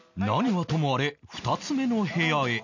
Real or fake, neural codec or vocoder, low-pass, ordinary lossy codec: real; none; 7.2 kHz; none